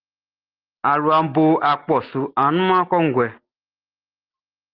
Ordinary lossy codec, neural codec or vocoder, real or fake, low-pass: Opus, 16 kbps; none; real; 5.4 kHz